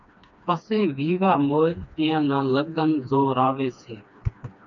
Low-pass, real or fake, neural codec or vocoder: 7.2 kHz; fake; codec, 16 kHz, 2 kbps, FreqCodec, smaller model